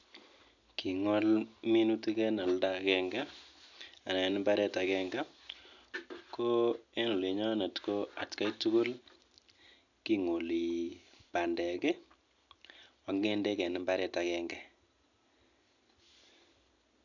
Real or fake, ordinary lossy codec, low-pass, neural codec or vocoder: real; none; 7.2 kHz; none